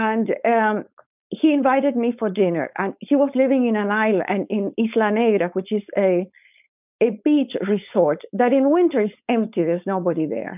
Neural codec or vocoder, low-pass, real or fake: codec, 16 kHz, 4.8 kbps, FACodec; 3.6 kHz; fake